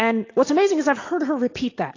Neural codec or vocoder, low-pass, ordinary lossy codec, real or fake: none; 7.2 kHz; AAC, 32 kbps; real